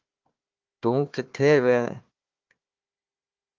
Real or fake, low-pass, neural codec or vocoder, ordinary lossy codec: fake; 7.2 kHz; codec, 16 kHz, 1 kbps, FunCodec, trained on Chinese and English, 50 frames a second; Opus, 32 kbps